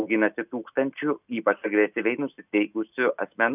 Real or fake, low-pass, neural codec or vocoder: real; 3.6 kHz; none